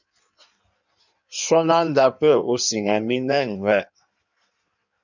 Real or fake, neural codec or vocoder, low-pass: fake; codec, 16 kHz in and 24 kHz out, 1.1 kbps, FireRedTTS-2 codec; 7.2 kHz